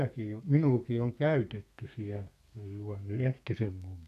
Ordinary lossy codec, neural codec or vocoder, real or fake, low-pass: none; codec, 32 kHz, 1.9 kbps, SNAC; fake; 14.4 kHz